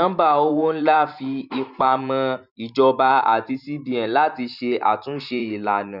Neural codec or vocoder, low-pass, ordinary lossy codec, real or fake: vocoder, 44.1 kHz, 128 mel bands every 512 samples, BigVGAN v2; 5.4 kHz; none; fake